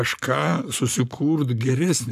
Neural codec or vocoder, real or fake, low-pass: codec, 44.1 kHz, 7.8 kbps, Pupu-Codec; fake; 14.4 kHz